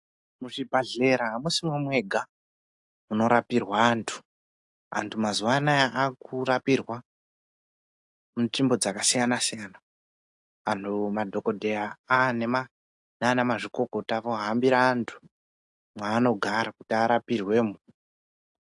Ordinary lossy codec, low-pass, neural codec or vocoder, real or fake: AAC, 64 kbps; 10.8 kHz; none; real